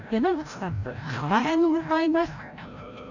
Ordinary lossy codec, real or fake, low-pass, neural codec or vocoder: none; fake; 7.2 kHz; codec, 16 kHz, 0.5 kbps, FreqCodec, larger model